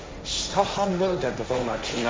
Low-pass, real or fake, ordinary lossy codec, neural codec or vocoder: none; fake; none; codec, 16 kHz, 1.1 kbps, Voila-Tokenizer